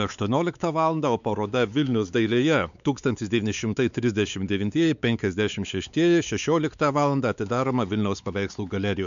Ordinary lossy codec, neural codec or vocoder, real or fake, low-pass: MP3, 96 kbps; codec, 16 kHz, 4 kbps, X-Codec, WavLM features, trained on Multilingual LibriSpeech; fake; 7.2 kHz